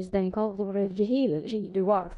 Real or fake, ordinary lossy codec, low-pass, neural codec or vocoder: fake; none; 10.8 kHz; codec, 16 kHz in and 24 kHz out, 0.4 kbps, LongCat-Audio-Codec, four codebook decoder